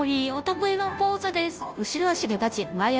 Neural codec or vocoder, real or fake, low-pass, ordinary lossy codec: codec, 16 kHz, 0.5 kbps, FunCodec, trained on Chinese and English, 25 frames a second; fake; none; none